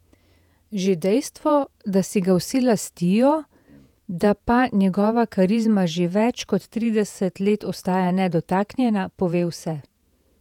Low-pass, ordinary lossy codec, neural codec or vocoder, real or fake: 19.8 kHz; none; vocoder, 48 kHz, 128 mel bands, Vocos; fake